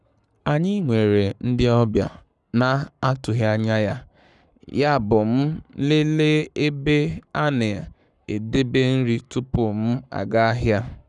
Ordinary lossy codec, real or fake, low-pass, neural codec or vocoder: none; fake; 10.8 kHz; codec, 44.1 kHz, 7.8 kbps, Pupu-Codec